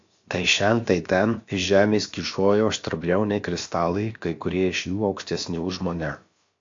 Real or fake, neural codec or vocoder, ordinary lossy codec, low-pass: fake; codec, 16 kHz, about 1 kbps, DyCAST, with the encoder's durations; AAC, 48 kbps; 7.2 kHz